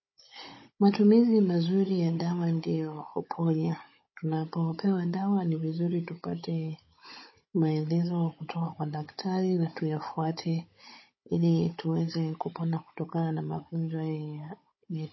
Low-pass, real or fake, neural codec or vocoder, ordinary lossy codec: 7.2 kHz; fake; codec, 16 kHz, 16 kbps, FunCodec, trained on Chinese and English, 50 frames a second; MP3, 24 kbps